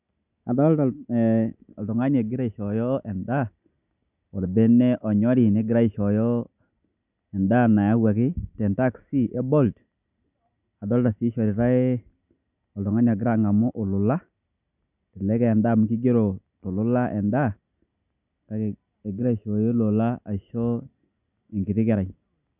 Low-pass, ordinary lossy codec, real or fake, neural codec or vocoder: 3.6 kHz; none; real; none